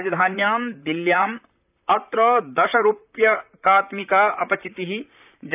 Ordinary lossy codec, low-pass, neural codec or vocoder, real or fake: none; 3.6 kHz; vocoder, 44.1 kHz, 128 mel bands, Pupu-Vocoder; fake